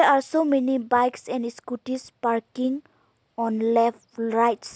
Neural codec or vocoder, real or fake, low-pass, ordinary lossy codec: none; real; none; none